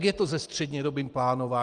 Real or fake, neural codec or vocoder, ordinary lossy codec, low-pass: real; none; Opus, 24 kbps; 9.9 kHz